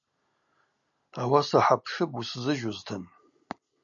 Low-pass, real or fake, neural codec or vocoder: 7.2 kHz; real; none